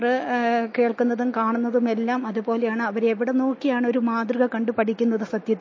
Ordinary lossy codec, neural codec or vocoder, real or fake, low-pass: MP3, 32 kbps; none; real; 7.2 kHz